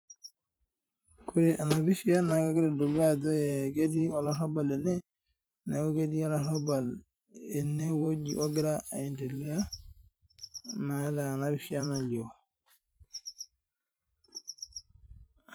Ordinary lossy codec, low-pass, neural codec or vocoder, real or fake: none; none; vocoder, 44.1 kHz, 128 mel bands every 512 samples, BigVGAN v2; fake